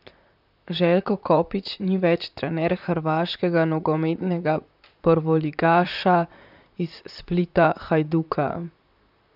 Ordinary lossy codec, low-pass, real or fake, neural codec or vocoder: none; 5.4 kHz; fake; vocoder, 44.1 kHz, 128 mel bands, Pupu-Vocoder